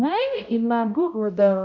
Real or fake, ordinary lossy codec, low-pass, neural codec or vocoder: fake; none; 7.2 kHz; codec, 16 kHz, 0.5 kbps, X-Codec, HuBERT features, trained on balanced general audio